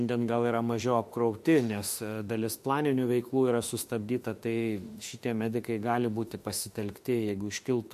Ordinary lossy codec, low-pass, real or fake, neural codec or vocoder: MP3, 64 kbps; 14.4 kHz; fake; autoencoder, 48 kHz, 32 numbers a frame, DAC-VAE, trained on Japanese speech